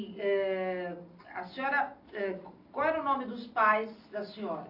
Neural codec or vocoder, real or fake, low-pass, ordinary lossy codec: none; real; 5.4 kHz; AAC, 32 kbps